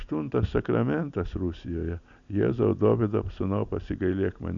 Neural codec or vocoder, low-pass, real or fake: none; 7.2 kHz; real